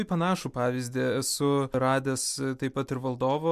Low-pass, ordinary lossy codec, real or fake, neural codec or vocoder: 14.4 kHz; MP3, 96 kbps; real; none